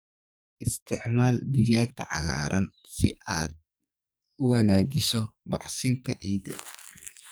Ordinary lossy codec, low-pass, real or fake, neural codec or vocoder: none; none; fake; codec, 44.1 kHz, 2.6 kbps, SNAC